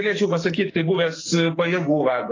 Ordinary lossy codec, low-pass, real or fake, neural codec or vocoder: AAC, 32 kbps; 7.2 kHz; fake; codec, 44.1 kHz, 2.6 kbps, SNAC